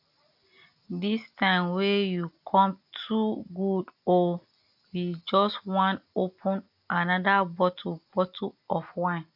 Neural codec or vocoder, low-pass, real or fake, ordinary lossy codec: none; 5.4 kHz; real; AAC, 48 kbps